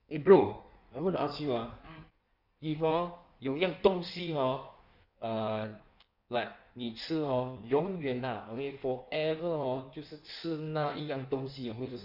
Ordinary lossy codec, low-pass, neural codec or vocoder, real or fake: none; 5.4 kHz; codec, 16 kHz in and 24 kHz out, 1.1 kbps, FireRedTTS-2 codec; fake